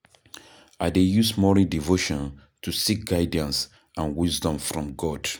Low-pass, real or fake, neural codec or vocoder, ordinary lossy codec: none; real; none; none